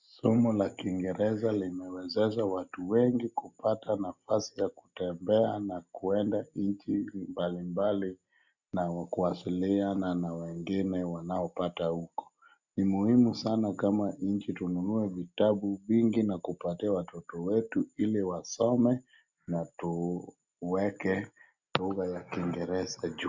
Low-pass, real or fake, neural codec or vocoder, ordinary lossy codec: 7.2 kHz; real; none; Opus, 64 kbps